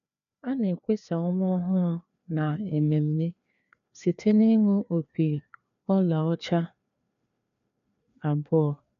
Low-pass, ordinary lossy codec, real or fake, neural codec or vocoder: 7.2 kHz; none; fake; codec, 16 kHz, 2 kbps, FreqCodec, larger model